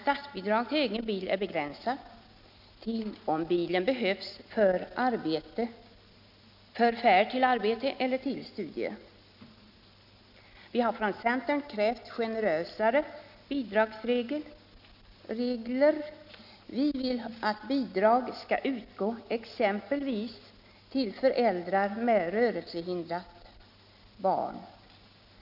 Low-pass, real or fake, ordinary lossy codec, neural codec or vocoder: 5.4 kHz; real; none; none